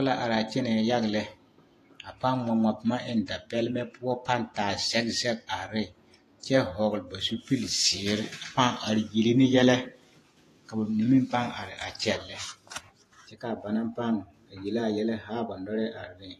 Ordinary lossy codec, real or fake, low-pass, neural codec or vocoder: AAC, 48 kbps; fake; 14.4 kHz; vocoder, 48 kHz, 128 mel bands, Vocos